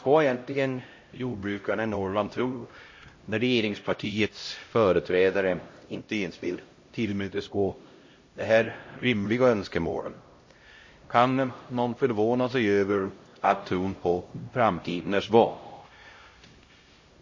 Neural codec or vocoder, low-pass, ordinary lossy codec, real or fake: codec, 16 kHz, 0.5 kbps, X-Codec, HuBERT features, trained on LibriSpeech; 7.2 kHz; MP3, 32 kbps; fake